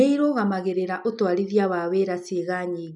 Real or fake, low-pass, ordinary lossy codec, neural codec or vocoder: real; 10.8 kHz; none; none